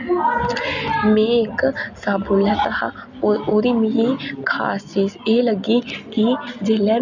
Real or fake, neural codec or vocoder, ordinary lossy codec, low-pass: real; none; none; 7.2 kHz